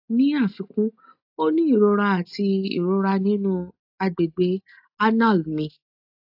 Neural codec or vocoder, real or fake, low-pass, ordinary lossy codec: none; real; 5.4 kHz; AAC, 48 kbps